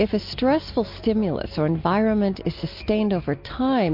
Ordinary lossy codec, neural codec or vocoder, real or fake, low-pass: MP3, 32 kbps; none; real; 5.4 kHz